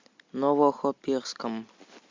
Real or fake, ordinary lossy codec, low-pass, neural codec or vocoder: real; MP3, 64 kbps; 7.2 kHz; none